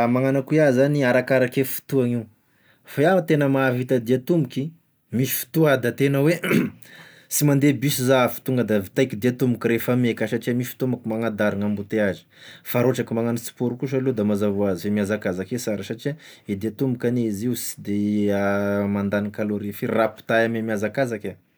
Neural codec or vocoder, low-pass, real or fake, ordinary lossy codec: none; none; real; none